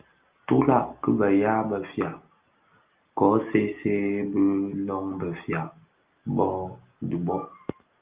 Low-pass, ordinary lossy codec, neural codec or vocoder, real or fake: 3.6 kHz; Opus, 24 kbps; none; real